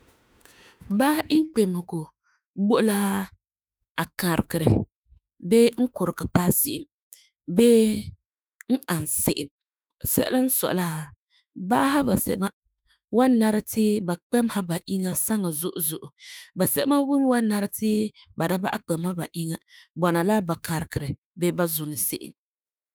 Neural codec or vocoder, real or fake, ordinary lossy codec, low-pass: autoencoder, 48 kHz, 32 numbers a frame, DAC-VAE, trained on Japanese speech; fake; none; none